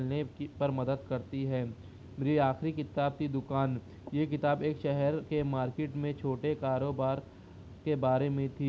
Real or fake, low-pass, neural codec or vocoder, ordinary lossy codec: real; none; none; none